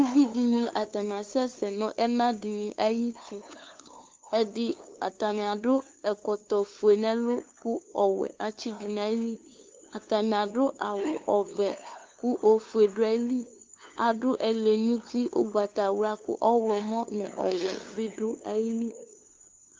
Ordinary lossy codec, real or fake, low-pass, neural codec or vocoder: Opus, 24 kbps; fake; 7.2 kHz; codec, 16 kHz, 2 kbps, FunCodec, trained on LibriTTS, 25 frames a second